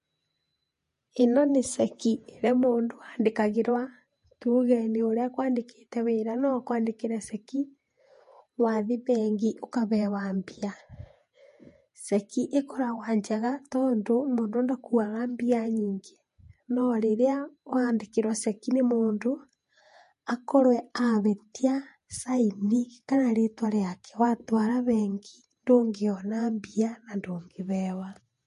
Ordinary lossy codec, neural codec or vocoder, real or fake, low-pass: MP3, 48 kbps; vocoder, 48 kHz, 128 mel bands, Vocos; fake; 14.4 kHz